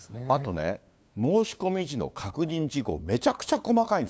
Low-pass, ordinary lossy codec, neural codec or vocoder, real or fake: none; none; codec, 16 kHz, 2 kbps, FunCodec, trained on LibriTTS, 25 frames a second; fake